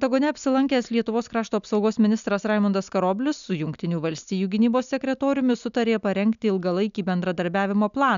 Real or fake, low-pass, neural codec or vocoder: real; 7.2 kHz; none